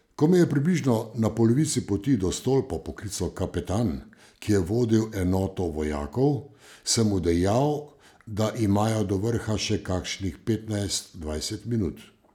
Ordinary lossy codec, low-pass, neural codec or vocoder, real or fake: none; 19.8 kHz; none; real